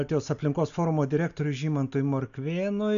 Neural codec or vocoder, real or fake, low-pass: none; real; 7.2 kHz